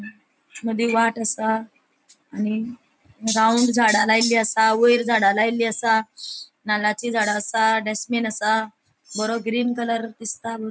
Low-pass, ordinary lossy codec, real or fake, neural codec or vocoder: none; none; real; none